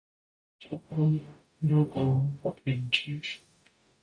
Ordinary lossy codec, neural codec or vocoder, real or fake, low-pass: MP3, 96 kbps; codec, 44.1 kHz, 0.9 kbps, DAC; fake; 9.9 kHz